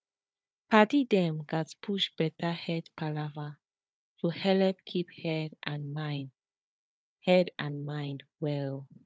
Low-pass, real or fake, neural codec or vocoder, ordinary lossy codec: none; fake; codec, 16 kHz, 4 kbps, FunCodec, trained on Chinese and English, 50 frames a second; none